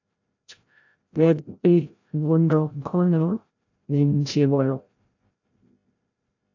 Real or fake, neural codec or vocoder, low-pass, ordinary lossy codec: fake; codec, 16 kHz, 0.5 kbps, FreqCodec, larger model; 7.2 kHz; AAC, 48 kbps